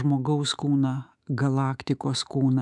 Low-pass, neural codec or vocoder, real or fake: 10.8 kHz; autoencoder, 48 kHz, 128 numbers a frame, DAC-VAE, trained on Japanese speech; fake